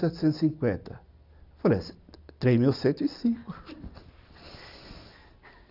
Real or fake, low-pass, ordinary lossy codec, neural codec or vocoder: real; 5.4 kHz; none; none